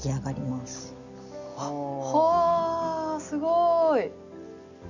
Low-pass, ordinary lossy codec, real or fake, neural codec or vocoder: 7.2 kHz; none; real; none